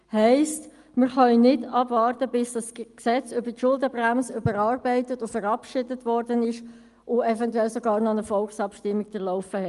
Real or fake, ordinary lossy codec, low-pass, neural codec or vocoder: real; Opus, 32 kbps; 10.8 kHz; none